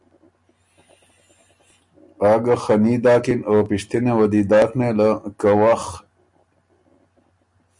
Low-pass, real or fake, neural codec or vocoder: 10.8 kHz; real; none